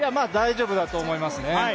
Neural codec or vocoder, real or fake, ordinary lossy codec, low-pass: none; real; none; none